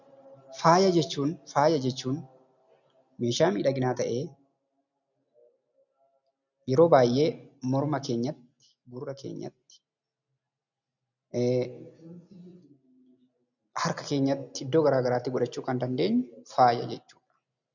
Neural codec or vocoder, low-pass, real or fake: none; 7.2 kHz; real